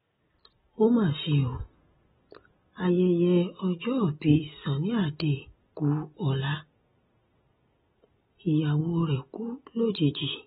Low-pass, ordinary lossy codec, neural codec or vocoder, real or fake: 19.8 kHz; AAC, 16 kbps; none; real